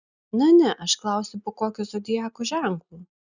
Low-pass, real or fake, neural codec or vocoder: 7.2 kHz; real; none